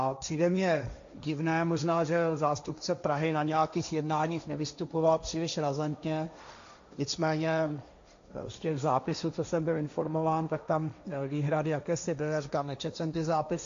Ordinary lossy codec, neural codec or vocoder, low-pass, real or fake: AAC, 64 kbps; codec, 16 kHz, 1.1 kbps, Voila-Tokenizer; 7.2 kHz; fake